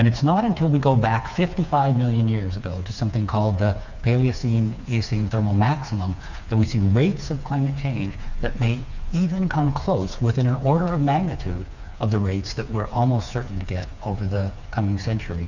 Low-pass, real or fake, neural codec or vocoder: 7.2 kHz; fake; codec, 16 kHz, 4 kbps, FreqCodec, smaller model